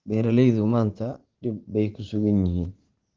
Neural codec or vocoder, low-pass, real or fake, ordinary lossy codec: vocoder, 44.1 kHz, 80 mel bands, Vocos; 7.2 kHz; fake; Opus, 16 kbps